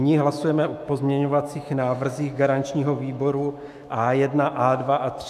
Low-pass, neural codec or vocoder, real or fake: 14.4 kHz; autoencoder, 48 kHz, 128 numbers a frame, DAC-VAE, trained on Japanese speech; fake